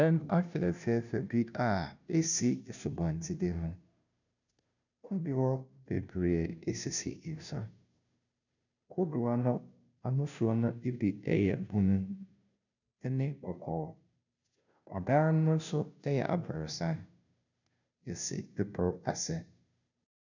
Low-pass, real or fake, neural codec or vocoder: 7.2 kHz; fake; codec, 16 kHz, 0.5 kbps, FunCodec, trained on Chinese and English, 25 frames a second